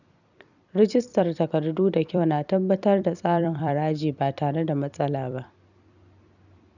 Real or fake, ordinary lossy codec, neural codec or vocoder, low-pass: fake; none; vocoder, 44.1 kHz, 128 mel bands every 256 samples, BigVGAN v2; 7.2 kHz